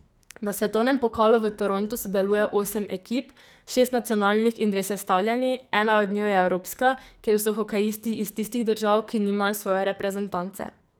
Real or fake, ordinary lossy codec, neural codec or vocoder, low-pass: fake; none; codec, 44.1 kHz, 2.6 kbps, SNAC; none